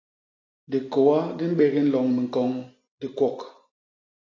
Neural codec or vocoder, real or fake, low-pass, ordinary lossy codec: none; real; 7.2 kHz; AAC, 32 kbps